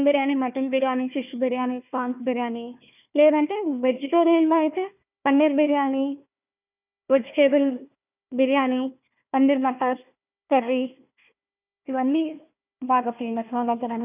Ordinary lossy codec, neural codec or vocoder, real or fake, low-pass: none; codec, 16 kHz, 1 kbps, FunCodec, trained on Chinese and English, 50 frames a second; fake; 3.6 kHz